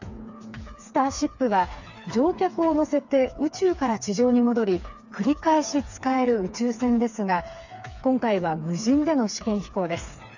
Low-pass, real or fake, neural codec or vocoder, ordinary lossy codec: 7.2 kHz; fake; codec, 16 kHz, 4 kbps, FreqCodec, smaller model; none